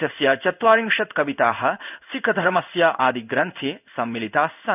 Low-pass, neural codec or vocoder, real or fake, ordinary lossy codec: 3.6 kHz; codec, 16 kHz in and 24 kHz out, 1 kbps, XY-Tokenizer; fake; none